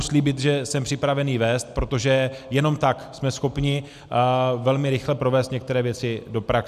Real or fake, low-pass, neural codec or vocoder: real; 14.4 kHz; none